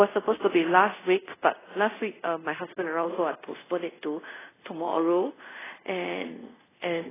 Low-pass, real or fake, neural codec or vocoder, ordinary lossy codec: 3.6 kHz; fake; codec, 24 kHz, 0.5 kbps, DualCodec; AAC, 16 kbps